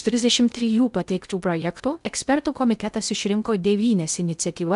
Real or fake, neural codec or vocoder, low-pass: fake; codec, 16 kHz in and 24 kHz out, 0.6 kbps, FocalCodec, streaming, 2048 codes; 10.8 kHz